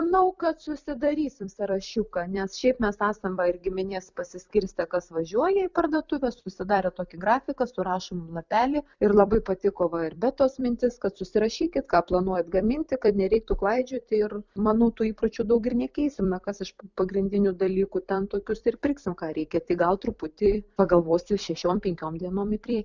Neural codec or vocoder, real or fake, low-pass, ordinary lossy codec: vocoder, 44.1 kHz, 128 mel bands every 256 samples, BigVGAN v2; fake; 7.2 kHz; Opus, 64 kbps